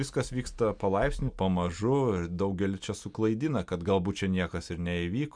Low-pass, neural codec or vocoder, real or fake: 9.9 kHz; none; real